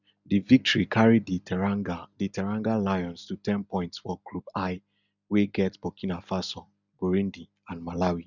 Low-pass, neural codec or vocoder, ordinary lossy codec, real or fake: 7.2 kHz; none; none; real